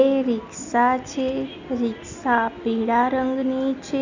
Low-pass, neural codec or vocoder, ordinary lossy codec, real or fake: 7.2 kHz; none; none; real